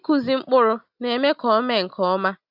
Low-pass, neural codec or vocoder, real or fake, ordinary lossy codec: 5.4 kHz; none; real; Opus, 64 kbps